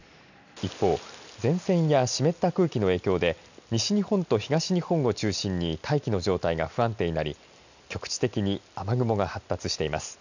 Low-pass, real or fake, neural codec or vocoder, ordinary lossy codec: 7.2 kHz; real; none; none